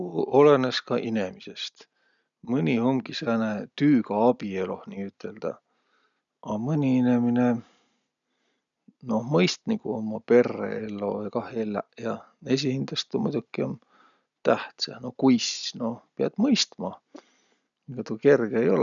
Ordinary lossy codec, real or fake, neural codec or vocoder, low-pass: none; real; none; 7.2 kHz